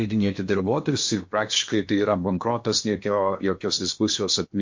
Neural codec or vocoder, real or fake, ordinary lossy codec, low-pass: codec, 16 kHz in and 24 kHz out, 0.8 kbps, FocalCodec, streaming, 65536 codes; fake; MP3, 48 kbps; 7.2 kHz